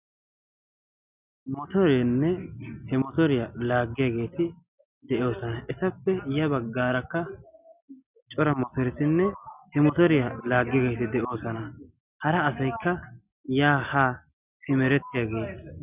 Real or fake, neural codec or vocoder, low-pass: real; none; 3.6 kHz